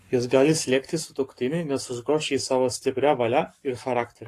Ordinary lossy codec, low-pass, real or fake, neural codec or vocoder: AAC, 48 kbps; 14.4 kHz; fake; codec, 44.1 kHz, 7.8 kbps, Pupu-Codec